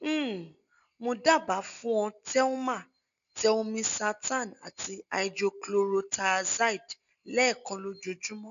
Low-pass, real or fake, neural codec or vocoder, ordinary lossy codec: 7.2 kHz; real; none; none